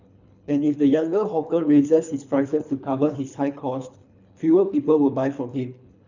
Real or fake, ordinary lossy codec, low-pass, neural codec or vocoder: fake; none; 7.2 kHz; codec, 24 kHz, 3 kbps, HILCodec